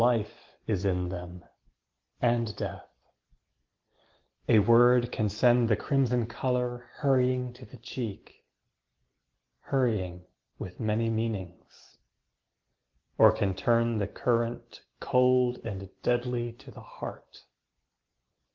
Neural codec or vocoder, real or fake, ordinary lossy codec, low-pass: none; real; Opus, 16 kbps; 7.2 kHz